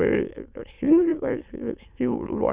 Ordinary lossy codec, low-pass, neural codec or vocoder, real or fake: Opus, 64 kbps; 3.6 kHz; autoencoder, 22.05 kHz, a latent of 192 numbers a frame, VITS, trained on many speakers; fake